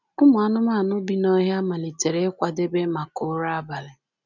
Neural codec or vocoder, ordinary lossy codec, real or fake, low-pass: none; none; real; 7.2 kHz